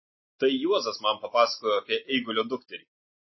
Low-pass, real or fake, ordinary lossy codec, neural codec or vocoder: 7.2 kHz; real; MP3, 24 kbps; none